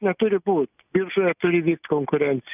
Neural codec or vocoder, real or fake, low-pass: none; real; 3.6 kHz